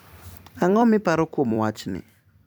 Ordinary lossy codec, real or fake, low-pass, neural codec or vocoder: none; fake; none; vocoder, 44.1 kHz, 128 mel bands every 256 samples, BigVGAN v2